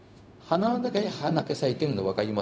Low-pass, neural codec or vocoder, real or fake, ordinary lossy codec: none; codec, 16 kHz, 0.4 kbps, LongCat-Audio-Codec; fake; none